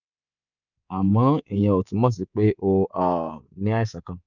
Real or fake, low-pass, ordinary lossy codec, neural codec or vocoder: fake; 7.2 kHz; none; codec, 24 kHz, 3.1 kbps, DualCodec